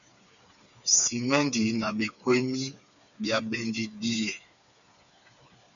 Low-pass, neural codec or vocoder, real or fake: 7.2 kHz; codec, 16 kHz, 4 kbps, FreqCodec, smaller model; fake